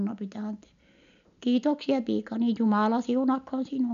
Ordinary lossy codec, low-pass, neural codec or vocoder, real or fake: none; 7.2 kHz; none; real